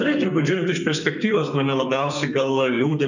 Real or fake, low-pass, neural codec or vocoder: fake; 7.2 kHz; codec, 32 kHz, 1.9 kbps, SNAC